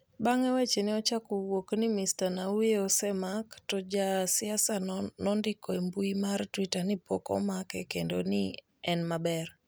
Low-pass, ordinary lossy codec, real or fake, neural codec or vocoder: none; none; real; none